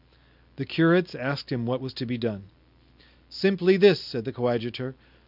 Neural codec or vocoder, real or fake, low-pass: none; real; 5.4 kHz